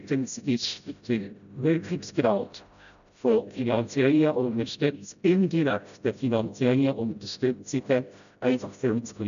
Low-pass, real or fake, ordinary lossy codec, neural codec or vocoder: 7.2 kHz; fake; none; codec, 16 kHz, 0.5 kbps, FreqCodec, smaller model